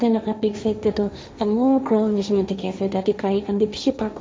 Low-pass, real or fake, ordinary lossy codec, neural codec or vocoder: none; fake; none; codec, 16 kHz, 1.1 kbps, Voila-Tokenizer